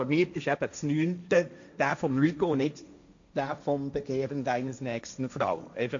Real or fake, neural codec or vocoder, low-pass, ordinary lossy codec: fake; codec, 16 kHz, 1.1 kbps, Voila-Tokenizer; 7.2 kHz; MP3, 64 kbps